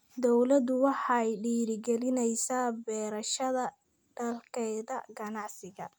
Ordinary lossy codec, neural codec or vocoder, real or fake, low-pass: none; none; real; none